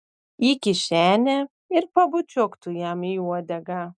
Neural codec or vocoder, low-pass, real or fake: none; 9.9 kHz; real